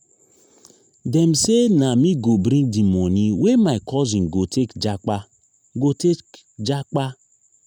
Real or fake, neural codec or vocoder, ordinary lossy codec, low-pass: real; none; none; 19.8 kHz